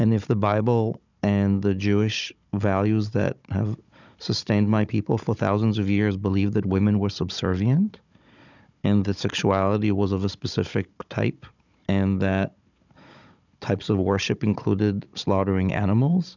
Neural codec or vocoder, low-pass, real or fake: codec, 16 kHz, 16 kbps, FunCodec, trained on Chinese and English, 50 frames a second; 7.2 kHz; fake